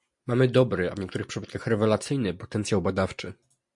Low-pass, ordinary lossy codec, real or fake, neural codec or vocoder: 10.8 kHz; MP3, 64 kbps; real; none